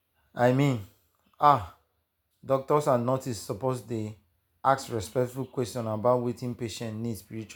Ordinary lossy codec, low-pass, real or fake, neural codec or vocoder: none; none; real; none